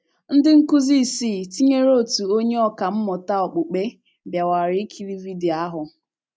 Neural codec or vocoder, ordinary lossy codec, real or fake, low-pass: none; none; real; none